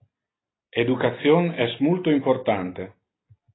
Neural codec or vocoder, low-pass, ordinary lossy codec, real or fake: none; 7.2 kHz; AAC, 16 kbps; real